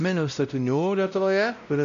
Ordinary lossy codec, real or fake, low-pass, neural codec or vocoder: AAC, 96 kbps; fake; 7.2 kHz; codec, 16 kHz, 0.5 kbps, X-Codec, WavLM features, trained on Multilingual LibriSpeech